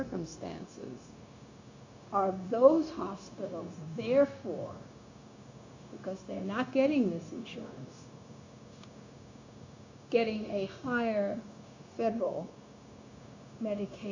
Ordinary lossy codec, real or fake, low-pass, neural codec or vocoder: AAC, 48 kbps; fake; 7.2 kHz; codec, 16 kHz, 0.9 kbps, LongCat-Audio-Codec